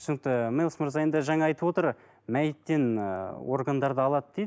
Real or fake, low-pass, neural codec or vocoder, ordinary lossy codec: real; none; none; none